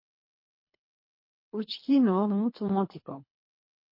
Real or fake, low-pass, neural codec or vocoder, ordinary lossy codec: fake; 5.4 kHz; codec, 24 kHz, 3 kbps, HILCodec; MP3, 32 kbps